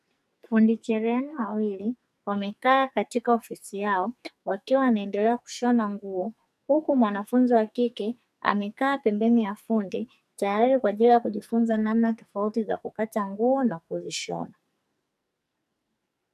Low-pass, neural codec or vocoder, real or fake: 14.4 kHz; codec, 44.1 kHz, 2.6 kbps, SNAC; fake